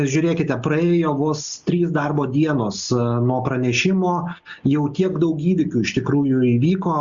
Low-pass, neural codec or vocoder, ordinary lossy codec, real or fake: 7.2 kHz; none; Opus, 64 kbps; real